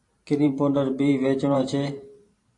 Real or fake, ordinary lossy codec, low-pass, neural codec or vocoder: fake; AAC, 48 kbps; 10.8 kHz; vocoder, 44.1 kHz, 128 mel bands every 512 samples, BigVGAN v2